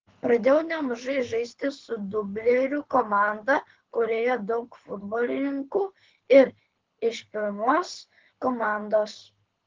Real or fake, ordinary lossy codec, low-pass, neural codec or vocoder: fake; Opus, 16 kbps; 7.2 kHz; codec, 24 kHz, 6 kbps, HILCodec